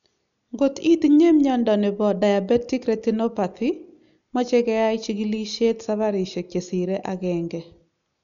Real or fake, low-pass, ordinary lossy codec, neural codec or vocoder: real; 7.2 kHz; none; none